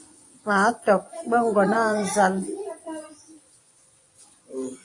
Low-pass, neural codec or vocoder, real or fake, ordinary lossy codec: 10.8 kHz; vocoder, 24 kHz, 100 mel bands, Vocos; fake; AAC, 48 kbps